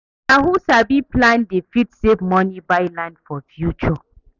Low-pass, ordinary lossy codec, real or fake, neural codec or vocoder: 7.2 kHz; none; real; none